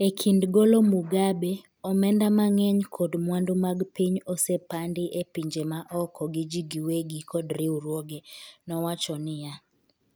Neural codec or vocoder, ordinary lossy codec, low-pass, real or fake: none; none; none; real